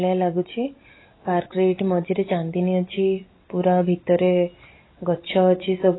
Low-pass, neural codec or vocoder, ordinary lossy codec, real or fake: 7.2 kHz; none; AAC, 16 kbps; real